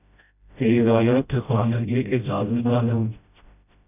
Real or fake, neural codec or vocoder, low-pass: fake; codec, 16 kHz, 0.5 kbps, FreqCodec, smaller model; 3.6 kHz